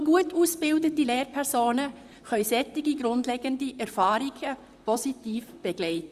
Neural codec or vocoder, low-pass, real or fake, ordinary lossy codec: vocoder, 44.1 kHz, 128 mel bands, Pupu-Vocoder; 14.4 kHz; fake; Opus, 64 kbps